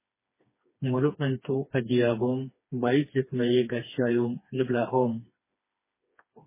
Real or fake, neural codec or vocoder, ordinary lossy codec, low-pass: fake; codec, 16 kHz, 2 kbps, FreqCodec, smaller model; MP3, 16 kbps; 3.6 kHz